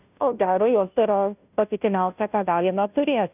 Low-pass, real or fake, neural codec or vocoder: 3.6 kHz; fake; codec, 16 kHz, 0.5 kbps, FunCodec, trained on Chinese and English, 25 frames a second